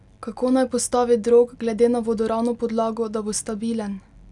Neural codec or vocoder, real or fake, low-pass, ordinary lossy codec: none; real; 10.8 kHz; none